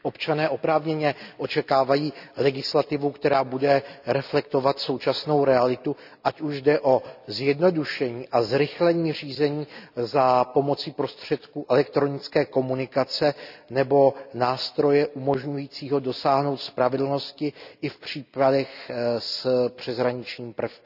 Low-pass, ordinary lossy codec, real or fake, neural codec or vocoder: 5.4 kHz; none; real; none